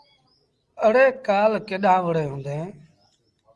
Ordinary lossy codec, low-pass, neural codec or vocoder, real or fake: Opus, 24 kbps; 10.8 kHz; none; real